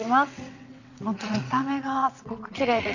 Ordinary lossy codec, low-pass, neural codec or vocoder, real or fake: none; 7.2 kHz; vocoder, 22.05 kHz, 80 mel bands, WaveNeXt; fake